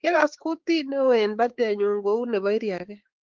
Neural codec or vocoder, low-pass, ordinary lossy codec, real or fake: codec, 16 kHz, 4 kbps, X-Codec, HuBERT features, trained on balanced general audio; 7.2 kHz; Opus, 16 kbps; fake